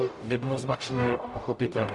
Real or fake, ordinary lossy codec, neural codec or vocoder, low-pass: fake; MP3, 96 kbps; codec, 44.1 kHz, 0.9 kbps, DAC; 10.8 kHz